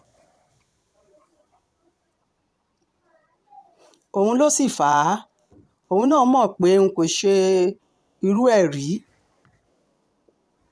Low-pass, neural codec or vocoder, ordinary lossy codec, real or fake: none; vocoder, 22.05 kHz, 80 mel bands, Vocos; none; fake